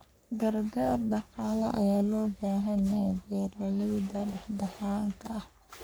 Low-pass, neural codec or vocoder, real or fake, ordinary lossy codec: none; codec, 44.1 kHz, 3.4 kbps, Pupu-Codec; fake; none